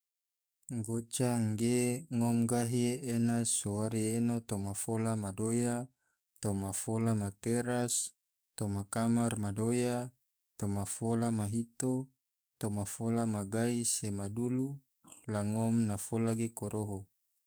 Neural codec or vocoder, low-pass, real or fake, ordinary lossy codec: codec, 44.1 kHz, 7.8 kbps, DAC; none; fake; none